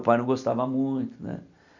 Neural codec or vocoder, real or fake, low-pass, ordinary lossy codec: none; real; 7.2 kHz; none